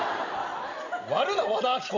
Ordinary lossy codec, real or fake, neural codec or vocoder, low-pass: none; real; none; 7.2 kHz